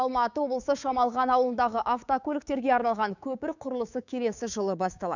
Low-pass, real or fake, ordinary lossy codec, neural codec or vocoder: 7.2 kHz; fake; none; codec, 16 kHz, 6 kbps, DAC